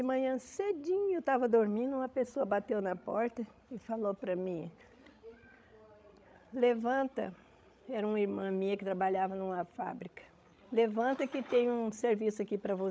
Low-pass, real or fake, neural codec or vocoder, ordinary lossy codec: none; fake; codec, 16 kHz, 16 kbps, FreqCodec, larger model; none